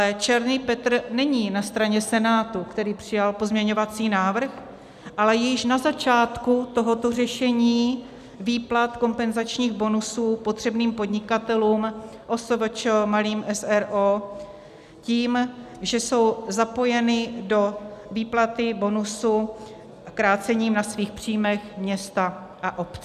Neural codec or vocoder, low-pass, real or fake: none; 14.4 kHz; real